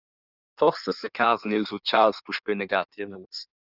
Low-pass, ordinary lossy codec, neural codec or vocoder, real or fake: 5.4 kHz; Opus, 64 kbps; codec, 16 kHz in and 24 kHz out, 1.1 kbps, FireRedTTS-2 codec; fake